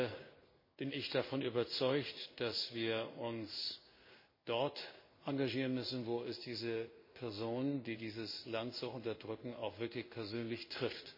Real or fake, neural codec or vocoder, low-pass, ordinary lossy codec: fake; codec, 16 kHz in and 24 kHz out, 1 kbps, XY-Tokenizer; 5.4 kHz; MP3, 24 kbps